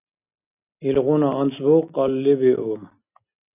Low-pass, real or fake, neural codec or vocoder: 3.6 kHz; real; none